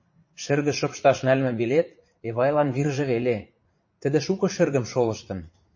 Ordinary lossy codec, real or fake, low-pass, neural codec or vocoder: MP3, 32 kbps; fake; 7.2 kHz; vocoder, 44.1 kHz, 80 mel bands, Vocos